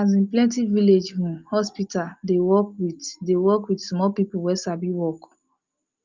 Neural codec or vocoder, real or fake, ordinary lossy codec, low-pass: none; real; Opus, 32 kbps; 7.2 kHz